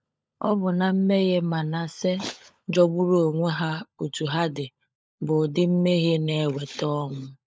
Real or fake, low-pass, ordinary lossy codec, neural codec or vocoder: fake; none; none; codec, 16 kHz, 16 kbps, FunCodec, trained on LibriTTS, 50 frames a second